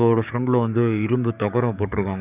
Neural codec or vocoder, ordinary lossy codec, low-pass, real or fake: codec, 16 kHz, 6 kbps, DAC; none; 3.6 kHz; fake